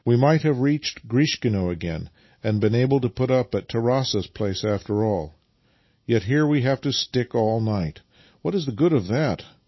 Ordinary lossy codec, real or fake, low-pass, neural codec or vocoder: MP3, 24 kbps; real; 7.2 kHz; none